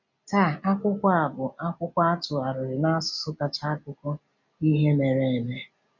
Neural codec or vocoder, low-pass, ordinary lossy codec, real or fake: none; 7.2 kHz; none; real